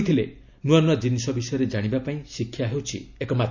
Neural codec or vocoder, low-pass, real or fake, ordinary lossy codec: none; 7.2 kHz; real; none